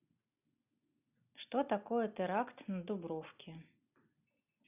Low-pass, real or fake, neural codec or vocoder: 3.6 kHz; real; none